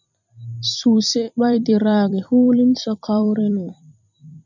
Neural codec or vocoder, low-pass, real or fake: vocoder, 44.1 kHz, 128 mel bands every 256 samples, BigVGAN v2; 7.2 kHz; fake